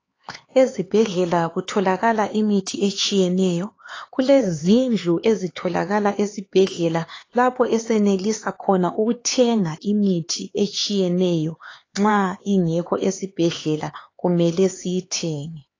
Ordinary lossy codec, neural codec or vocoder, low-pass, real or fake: AAC, 32 kbps; codec, 16 kHz, 4 kbps, X-Codec, HuBERT features, trained on LibriSpeech; 7.2 kHz; fake